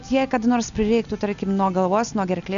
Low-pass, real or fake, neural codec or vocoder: 7.2 kHz; real; none